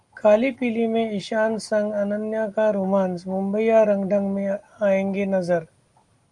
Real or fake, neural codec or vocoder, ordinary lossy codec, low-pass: real; none; Opus, 32 kbps; 10.8 kHz